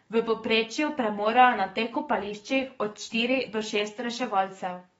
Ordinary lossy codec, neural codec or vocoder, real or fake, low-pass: AAC, 24 kbps; codec, 44.1 kHz, 7.8 kbps, DAC; fake; 19.8 kHz